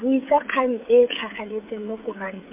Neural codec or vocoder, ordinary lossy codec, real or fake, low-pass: codec, 16 kHz, 16 kbps, FreqCodec, smaller model; MP3, 24 kbps; fake; 3.6 kHz